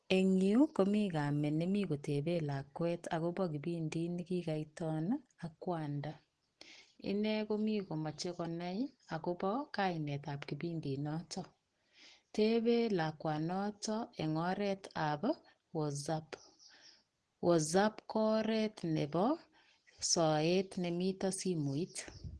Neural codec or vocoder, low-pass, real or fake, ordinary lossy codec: none; 9.9 kHz; real; Opus, 16 kbps